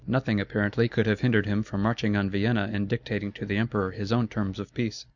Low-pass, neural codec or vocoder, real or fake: 7.2 kHz; none; real